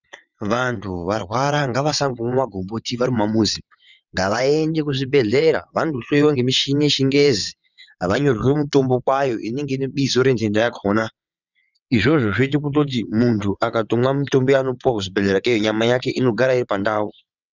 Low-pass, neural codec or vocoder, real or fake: 7.2 kHz; vocoder, 22.05 kHz, 80 mel bands, WaveNeXt; fake